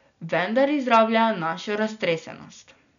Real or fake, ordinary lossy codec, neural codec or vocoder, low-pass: real; none; none; 7.2 kHz